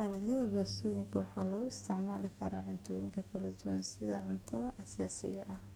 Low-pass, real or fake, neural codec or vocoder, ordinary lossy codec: none; fake; codec, 44.1 kHz, 2.6 kbps, DAC; none